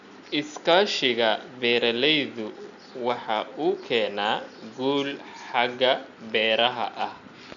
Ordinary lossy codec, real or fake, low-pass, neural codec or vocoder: none; real; 7.2 kHz; none